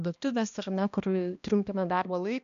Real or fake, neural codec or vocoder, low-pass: fake; codec, 16 kHz, 1 kbps, X-Codec, HuBERT features, trained on balanced general audio; 7.2 kHz